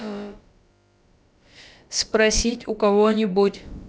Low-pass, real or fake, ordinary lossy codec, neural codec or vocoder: none; fake; none; codec, 16 kHz, about 1 kbps, DyCAST, with the encoder's durations